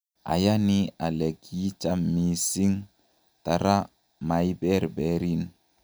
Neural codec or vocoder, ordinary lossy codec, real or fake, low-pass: none; none; real; none